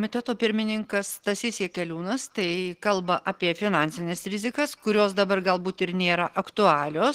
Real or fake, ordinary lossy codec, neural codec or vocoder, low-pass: real; Opus, 16 kbps; none; 14.4 kHz